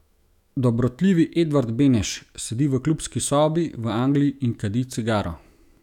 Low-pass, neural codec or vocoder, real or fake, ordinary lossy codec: 19.8 kHz; autoencoder, 48 kHz, 128 numbers a frame, DAC-VAE, trained on Japanese speech; fake; none